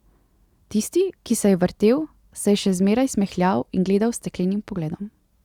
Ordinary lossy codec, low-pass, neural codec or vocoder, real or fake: Opus, 64 kbps; 19.8 kHz; none; real